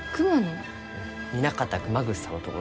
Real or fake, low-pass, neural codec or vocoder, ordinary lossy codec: real; none; none; none